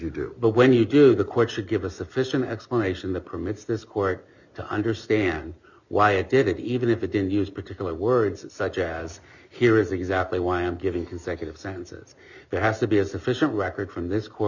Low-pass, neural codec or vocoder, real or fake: 7.2 kHz; none; real